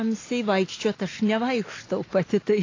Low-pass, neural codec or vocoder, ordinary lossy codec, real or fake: 7.2 kHz; none; AAC, 32 kbps; real